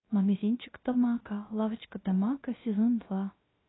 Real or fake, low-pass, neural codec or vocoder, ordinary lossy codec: fake; 7.2 kHz; codec, 16 kHz, 0.3 kbps, FocalCodec; AAC, 16 kbps